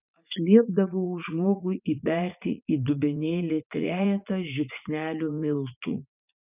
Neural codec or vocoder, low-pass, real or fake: vocoder, 44.1 kHz, 80 mel bands, Vocos; 3.6 kHz; fake